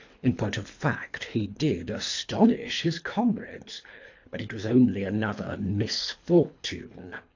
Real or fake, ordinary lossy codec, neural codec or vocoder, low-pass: fake; AAC, 48 kbps; codec, 24 kHz, 3 kbps, HILCodec; 7.2 kHz